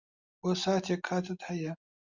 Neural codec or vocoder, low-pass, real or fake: none; 7.2 kHz; real